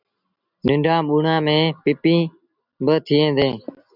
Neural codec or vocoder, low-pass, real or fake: none; 5.4 kHz; real